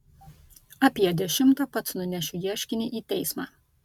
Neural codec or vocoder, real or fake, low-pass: none; real; 19.8 kHz